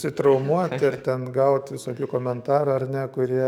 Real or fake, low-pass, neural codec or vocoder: fake; 19.8 kHz; codec, 44.1 kHz, 7.8 kbps, DAC